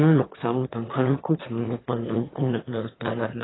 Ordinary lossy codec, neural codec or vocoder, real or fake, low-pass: AAC, 16 kbps; autoencoder, 22.05 kHz, a latent of 192 numbers a frame, VITS, trained on one speaker; fake; 7.2 kHz